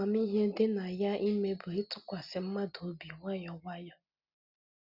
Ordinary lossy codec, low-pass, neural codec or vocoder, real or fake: none; 5.4 kHz; none; real